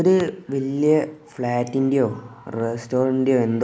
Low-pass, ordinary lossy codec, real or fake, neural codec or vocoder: none; none; real; none